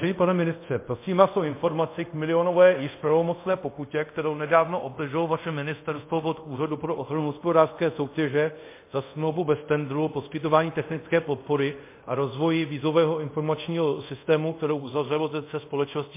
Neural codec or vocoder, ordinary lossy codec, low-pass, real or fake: codec, 24 kHz, 0.5 kbps, DualCodec; MP3, 24 kbps; 3.6 kHz; fake